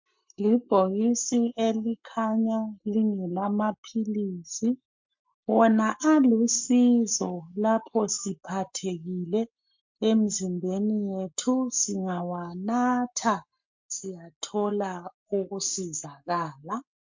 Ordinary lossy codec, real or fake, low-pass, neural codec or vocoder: MP3, 48 kbps; fake; 7.2 kHz; codec, 44.1 kHz, 7.8 kbps, Pupu-Codec